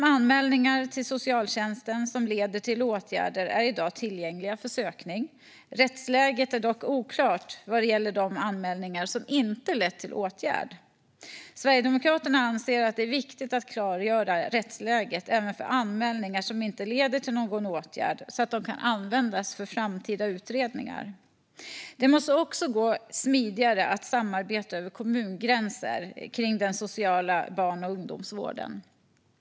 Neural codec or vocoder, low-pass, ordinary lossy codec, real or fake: none; none; none; real